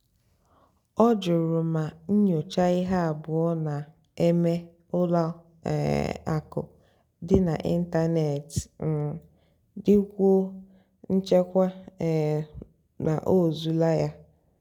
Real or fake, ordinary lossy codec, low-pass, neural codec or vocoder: real; none; 19.8 kHz; none